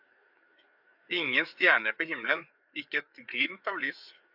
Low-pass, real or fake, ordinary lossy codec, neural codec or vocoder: 5.4 kHz; fake; AAC, 48 kbps; codec, 16 kHz, 4 kbps, FreqCodec, larger model